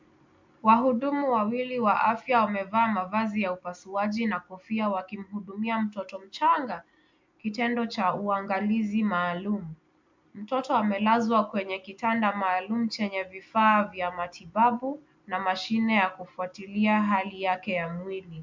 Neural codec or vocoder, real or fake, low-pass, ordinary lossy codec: none; real; 7.2 kHz; MP3, 64 kbps